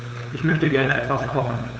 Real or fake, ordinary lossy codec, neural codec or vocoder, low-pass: fake; none; codec, 16 kHz, 8 kbps, FunCodec, trained on LibriTTS, 25 frames a second; none